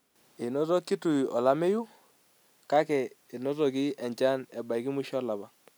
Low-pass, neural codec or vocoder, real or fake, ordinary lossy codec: none; none; real; none